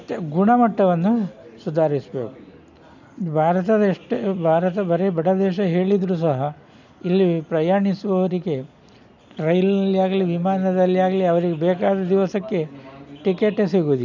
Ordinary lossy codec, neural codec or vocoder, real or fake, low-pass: none; none; real; 7.2 kHz